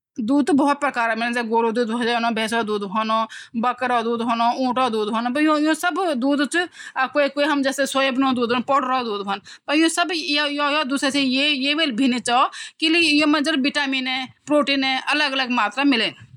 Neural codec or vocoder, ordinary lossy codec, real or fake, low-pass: none; none; real; 19.8 kHz